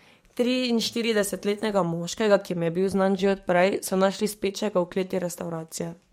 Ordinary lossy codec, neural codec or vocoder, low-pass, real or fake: MP3, 64 kbps; codec, 44.1 kHz, 7.8 kbps, DAC; 19.8 kHz; fake